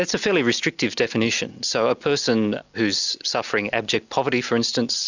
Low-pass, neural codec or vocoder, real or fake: 7.2 kHz; none; real